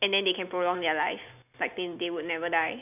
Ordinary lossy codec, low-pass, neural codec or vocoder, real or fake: none; 3.6 kHz; none; real